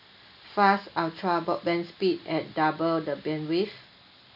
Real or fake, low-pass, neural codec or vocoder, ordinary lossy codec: real; 5.4 kHz; none; none